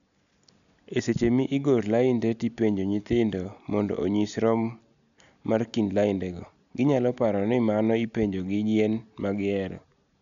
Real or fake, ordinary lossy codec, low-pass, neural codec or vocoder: real; none; 7.2 kHz; none